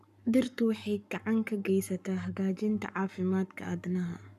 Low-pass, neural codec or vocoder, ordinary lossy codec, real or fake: 14.4 kHz; codec, 44.1 kHz, 7.8 kbps, Pupu-Codec; none; fake